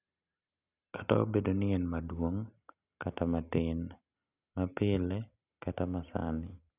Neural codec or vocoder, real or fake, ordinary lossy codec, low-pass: none; real; none; 3.6 kHz